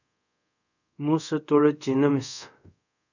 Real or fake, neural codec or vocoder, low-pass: fake; codec, 24 kHz, 0.5 kbps, DualCodec; 7.2 kHz